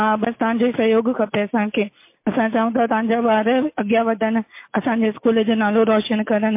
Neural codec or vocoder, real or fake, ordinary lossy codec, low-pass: none; real; MP3, 24 kbps; 3.6 kHz